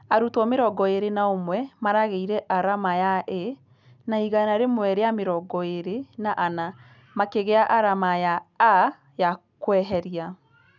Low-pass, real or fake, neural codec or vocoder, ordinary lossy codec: 7.2 kHz; real; none; none